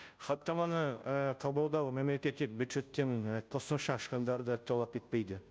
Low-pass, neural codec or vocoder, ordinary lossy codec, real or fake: none; codec, 16 kHz, 0.5 kbps, FunCodec, trained on Chinese and English, 25 frames a second; none; fake